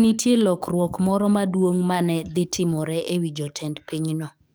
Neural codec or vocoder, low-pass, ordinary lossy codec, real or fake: codec, 44.1 kHz, 7.8 kbps, DAC; none; none; fake